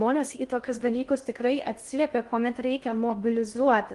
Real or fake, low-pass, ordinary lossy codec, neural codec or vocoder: fake; 10.8 kHz; Opus, 24 kbps; codec, 16 kHz in and 24 kHz out, 0.6 kbps, FocalCodec, streaming, 2048 codes